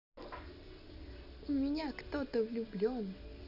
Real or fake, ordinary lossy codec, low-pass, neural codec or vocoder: real; none; 5.4 kHz; none